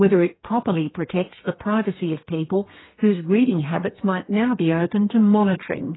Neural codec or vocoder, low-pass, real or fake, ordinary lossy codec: codec, 32 kHz, 1.9 kbps, SNAC; 7.2 kHz; fake; AAC, 16 kbps